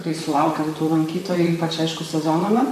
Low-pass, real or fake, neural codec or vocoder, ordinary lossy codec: 14.4 kHz; fake; vocoder, 44.1 kHz, 128 mel bands, Pupu-Vocoder; MP3, 96 kbps